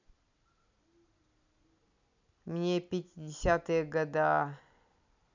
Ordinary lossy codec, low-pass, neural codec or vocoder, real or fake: none; 7.2 kHz; none; real